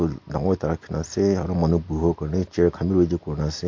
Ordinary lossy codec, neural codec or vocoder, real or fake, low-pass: MP3, 48 kbps; none; real; 7.2 kHz